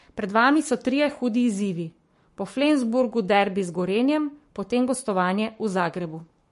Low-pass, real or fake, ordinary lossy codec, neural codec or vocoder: 14.4 kHz; fake; MP3, 48 kbps; codec, 44.1 kHz, 7.8 kbps, Pupu-Codec